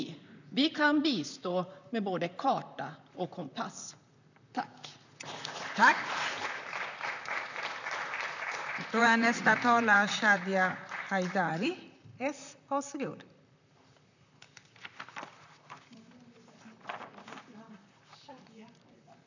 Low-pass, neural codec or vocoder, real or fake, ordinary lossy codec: 7.2 kHz; vocoder, 44.1 kHz, 128 mel bands, Pupu-Vocoder; fake; none